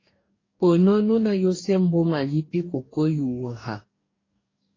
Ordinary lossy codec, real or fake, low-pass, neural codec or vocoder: AAC, 32 kbps; fake; 7.2 kHz; codec, 44.1 kHz, 2.6 kbps, DAC